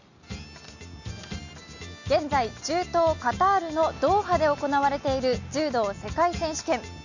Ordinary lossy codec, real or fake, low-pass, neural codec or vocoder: none; real; 7.2 kHz; none